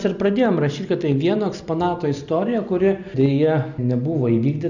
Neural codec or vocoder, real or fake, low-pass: none; real; 7.2 kHz